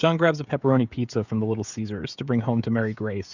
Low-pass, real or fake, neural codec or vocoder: 7.2 kHz; fake; codec, 16 kHz, 16 kbps, FreqCodec, smaller model